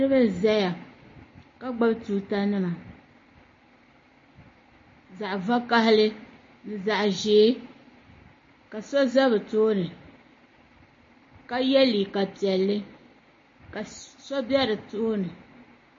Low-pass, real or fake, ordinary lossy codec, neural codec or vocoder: 7.2 kHz; real; MP3, 32 kbps; none